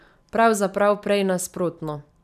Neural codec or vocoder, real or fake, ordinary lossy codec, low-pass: none; real; none; 14.4 kHz